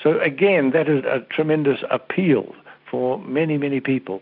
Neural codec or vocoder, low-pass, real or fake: none; 5.4 kHz; real